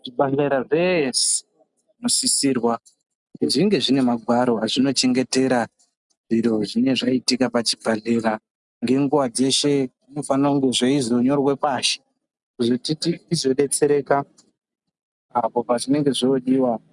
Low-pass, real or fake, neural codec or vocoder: 10.8 kHz; real; none